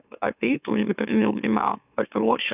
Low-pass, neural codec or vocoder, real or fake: 3.6 kHz; autoencoder, 44.1 kHz, a latent of 192 numbers a frame, MeloTTS; fake